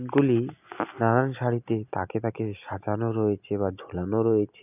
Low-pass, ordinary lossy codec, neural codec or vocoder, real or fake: 3.6 kHz; none; none; real